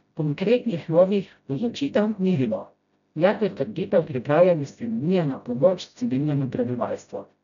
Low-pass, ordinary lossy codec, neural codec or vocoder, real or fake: 7.2 kHz; none; codec, 16 kHz, 0.5 kbps, FreqCodec, smaller model; fake